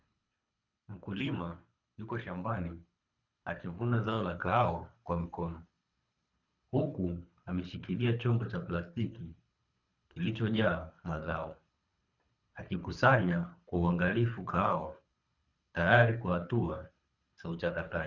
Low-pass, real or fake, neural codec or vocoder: 7.2 kHz; fake; codec, 24 kHz, 3 kbps, HILCodec